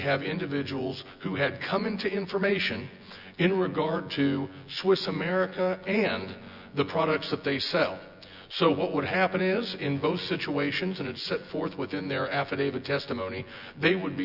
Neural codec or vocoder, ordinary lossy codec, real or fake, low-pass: vocoder, 24 kHz, 100 mel bands, Vocos; Opus, 64 kbps; fake; 5.4 kHz